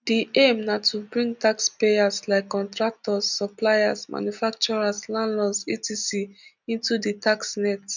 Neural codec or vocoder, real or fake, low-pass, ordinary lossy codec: none; real; 7.2 kHz; none